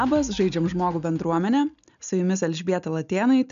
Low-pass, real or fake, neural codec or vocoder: 7.2 kHz; real; none